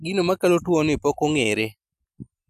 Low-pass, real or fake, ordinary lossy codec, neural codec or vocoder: 14.4 kHz; real; none; none